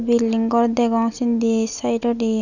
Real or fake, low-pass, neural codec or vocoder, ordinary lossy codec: real; 7.2 kHz; none; none